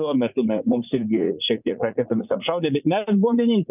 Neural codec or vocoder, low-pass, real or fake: vocoder, 44.1 kHz, 80 mel bands, Vocos; 3.6 kHz; fake